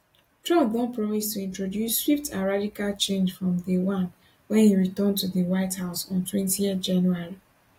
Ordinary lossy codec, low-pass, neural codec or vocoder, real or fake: AAC, 48 kbps; 19.8 kHz; none; real